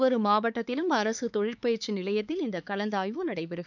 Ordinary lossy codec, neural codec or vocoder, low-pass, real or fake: none; codec, 16 kHz, 4 kbps, X-Codec, HuBERT features, trained on LibriSpeech; 7.2 kHz; fake